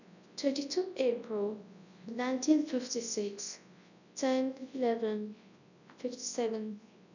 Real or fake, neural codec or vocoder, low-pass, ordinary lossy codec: fake; codec, 24 kHz, 0.9 kbps, WavTokenizer, large speech release; 7.2 kHz; none